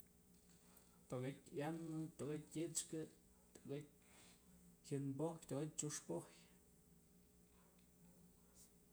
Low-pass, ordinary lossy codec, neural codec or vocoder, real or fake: none; none; vocoder, 48 kHz, 128 mel bands, Vocos; fake